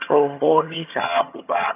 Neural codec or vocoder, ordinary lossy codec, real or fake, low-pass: vocoder, 22.05 kHz, 80 mel bands, HiFi-GAN; none; fake; 3.6 kHz